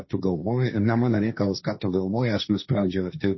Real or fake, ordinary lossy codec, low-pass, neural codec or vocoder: fake; MP3, 24 kbps; 7.2 kHz; codec, 16 kHz, 1.1 kbps, Voila-Tokenizer